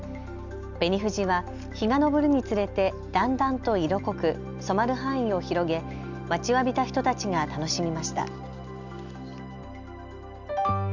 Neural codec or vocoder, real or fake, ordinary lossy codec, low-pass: none; real; none; 7.2 kHz